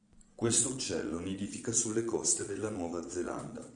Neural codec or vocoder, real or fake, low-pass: vocoder, 22.05 kHz, 80 mel bands, Vocos; fake; 9.9 kHz